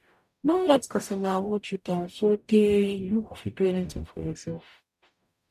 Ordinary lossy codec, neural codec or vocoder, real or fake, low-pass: none; codec, 44.1 kHz, 0.9 kbps, DAC; fake; 14.4 kHz